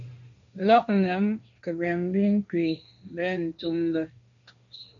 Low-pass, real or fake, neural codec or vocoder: 7.2 kHz; fake; codec, 16 kHz, 1.1 kbps, Voila-Tokenizer